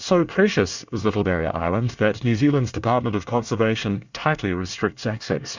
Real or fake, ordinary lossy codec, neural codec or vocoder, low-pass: fake; Opus, 64 kbps; codec, 24 kHz, 1 kbps, SNAC; 7.2 kHz